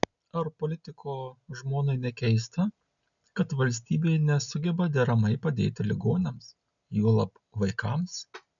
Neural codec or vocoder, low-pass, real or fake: none; 7.2 kHz; real